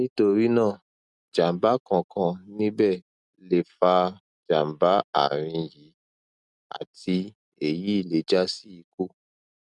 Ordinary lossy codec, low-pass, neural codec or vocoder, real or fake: none; 10.8 kHz; none; real